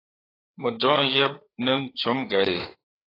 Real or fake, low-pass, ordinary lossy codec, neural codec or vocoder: fake; 5.4 kHz; AAC, 24 kbps; codec, 16 kHz, 4 kbps, FreqCodec, larger model